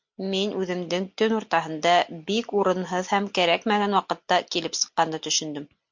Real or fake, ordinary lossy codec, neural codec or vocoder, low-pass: real; MP3, 64 kbps; none; 7.2 kHz